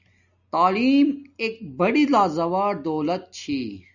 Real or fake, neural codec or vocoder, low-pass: real; none; 7.2 kHz